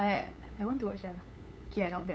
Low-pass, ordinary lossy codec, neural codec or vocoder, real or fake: none; none; codec, 16 kHz, 8 kbps, FunCodec, trained on LibriTTS, 25 frames a second; fake